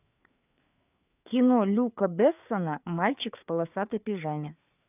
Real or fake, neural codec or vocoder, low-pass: fake; codec, 16 kHz, 4 kbps, FreqCodec, larger model; 3.6 kHz